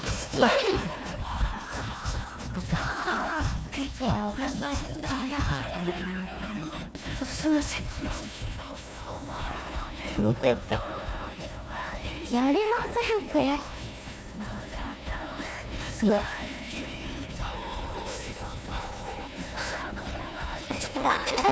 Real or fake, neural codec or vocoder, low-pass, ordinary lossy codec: fake; codec, 16 kHz, 1 kbps, FunCodec, trained on Chinese and English, 50 frames a second; none; none